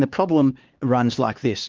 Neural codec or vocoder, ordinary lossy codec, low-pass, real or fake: codec, 16 kHz, 2 kbps, X-Codec, HuBERT features, trained on LibriSpeech; Opus, 24 kbps; 7.2 kHz; fake